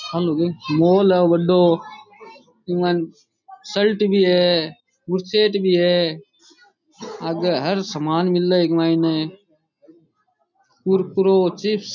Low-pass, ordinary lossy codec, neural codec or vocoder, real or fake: 7.2 kHz; none; none; real